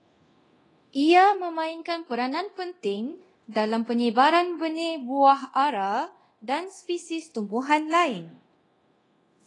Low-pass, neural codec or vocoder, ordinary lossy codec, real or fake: 10.8 kHz; codec, 24 kHz, 0.9 kbps, DualCodec; AAC, 32 kbps; fake